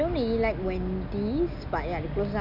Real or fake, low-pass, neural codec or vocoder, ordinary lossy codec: real; 5.4 kHz; none; none